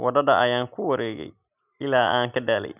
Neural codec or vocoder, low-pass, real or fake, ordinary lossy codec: none; 3.6 kHz; real; none